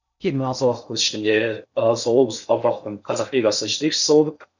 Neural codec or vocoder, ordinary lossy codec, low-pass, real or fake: codec, 16 kHz in and 24 kHz out, 0.6 kbps, FocalCodec, streaming, 2048 codes; none; 7.2 kHz; fake